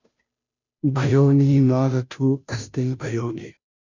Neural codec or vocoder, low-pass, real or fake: codec, 16 kHz, 0.5 kbps, FunCodec, trained on Chinese and English, 25 frames a second; 7.2 kHz; fake